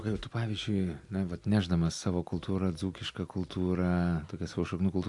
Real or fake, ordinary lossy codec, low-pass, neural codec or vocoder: real; AAC, 64 kbps; 10.8 kHz; none